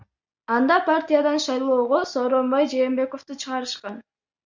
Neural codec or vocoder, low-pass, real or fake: none; 7.2 kHz; real